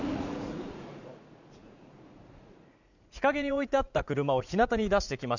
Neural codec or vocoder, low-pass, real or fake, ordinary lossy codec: vocoder, 44.1 kHz, 80 mel bands, Vocos; 7.2 kHz; fake; none